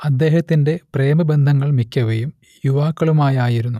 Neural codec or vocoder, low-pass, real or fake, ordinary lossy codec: none; 14.4 kHz; real; none